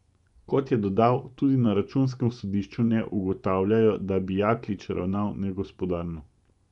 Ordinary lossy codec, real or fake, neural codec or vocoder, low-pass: none; fake; vocoder, 24 kHz, 100 mel bands, Vocos; 10.8 kHz